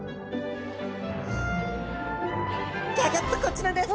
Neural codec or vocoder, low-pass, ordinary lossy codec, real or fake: none; none; none; real